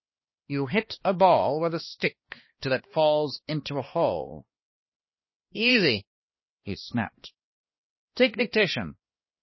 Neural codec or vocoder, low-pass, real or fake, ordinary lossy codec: codec, 16 kHz, 2 kbps, X-Codec, HuBERT features, trained on balanced general audio; 7.2 kHz; fake; MP3, 24 kbps